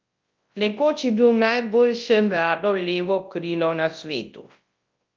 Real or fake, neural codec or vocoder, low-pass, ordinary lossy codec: fake; codec, 24 kHz, 0.9 kbps, WavTokenizer, large speech release; 7.2 kHz; Opus, 24 kbps